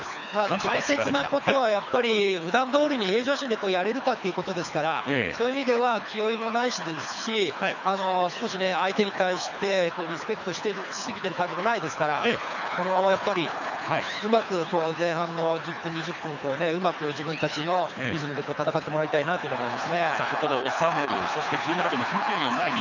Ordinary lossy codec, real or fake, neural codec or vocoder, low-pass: none; fake; codec, 24 kHz, 3 kbps, HILCodec; 7.2 kHz